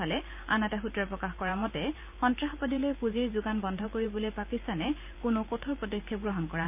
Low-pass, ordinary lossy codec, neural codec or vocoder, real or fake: 3.6 kHz; none; vocoder, 44.1 kHz, 128 mel bands every 512 samples, BigVGAN v2; fake